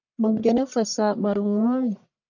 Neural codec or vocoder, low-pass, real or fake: codec, 44.1 kHz, 1.7 kbps, Pupu-Codec; 7.2 kHz; fake